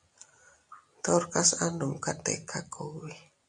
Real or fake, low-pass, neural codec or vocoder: real; 10.8 kHz; none